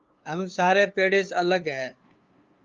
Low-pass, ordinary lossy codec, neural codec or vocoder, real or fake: 7.2 kHz; Opus, 32 kbps; codec, 16 kHz, 2 kbps, FunCodec, trained on LibriTTS, 25 frames a second; fake